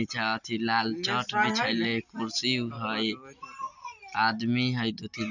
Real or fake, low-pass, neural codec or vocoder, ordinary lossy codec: real; 7.2 kHz; none; none